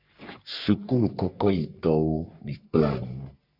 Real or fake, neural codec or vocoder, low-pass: fake; codec, 44.1 kHz, 3.4 kbps, Pupu-Codec; 5.4 kHz